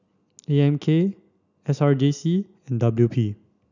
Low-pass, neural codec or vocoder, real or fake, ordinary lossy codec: 7.2 kHz; none; real; none